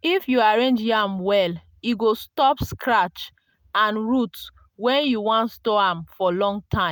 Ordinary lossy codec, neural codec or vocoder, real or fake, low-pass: none; none; real; none